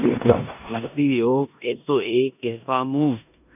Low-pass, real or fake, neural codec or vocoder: 3.6 kHz; fake; codec, 16 kHz in and 24 kHz out, 0.9 kbps, LongCat-Audio-Codec, four codebook decoder